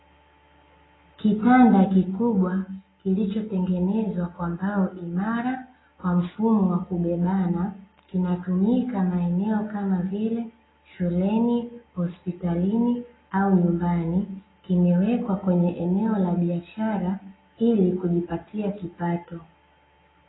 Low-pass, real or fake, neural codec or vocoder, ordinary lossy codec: 7.2 kHz; real; none; AAC, 16 kbps